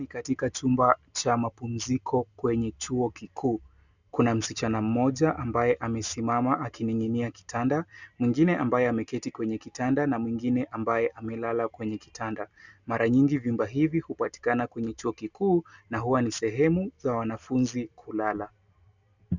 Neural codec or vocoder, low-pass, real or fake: none; 7.2 kHz; real